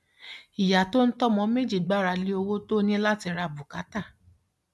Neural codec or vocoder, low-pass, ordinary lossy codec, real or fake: none; none; none; real